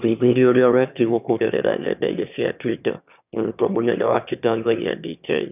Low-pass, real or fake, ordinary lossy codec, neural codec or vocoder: 3.6 kHz; fake; none; autoencoder, 22.05 kHz, a latent of 192 numbers a frame, VITS, trained on one speaker